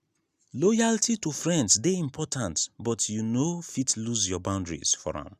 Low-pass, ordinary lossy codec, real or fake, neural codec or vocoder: 14.4 kHz; none; real; none